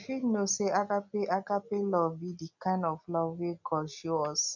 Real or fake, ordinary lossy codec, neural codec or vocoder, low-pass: real; none; none; 7.2 kHz